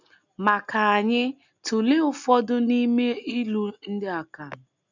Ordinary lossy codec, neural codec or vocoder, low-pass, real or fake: none; none; 7.2 kHz; real